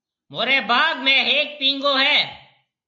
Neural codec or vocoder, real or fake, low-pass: none; real; 7.2 kHz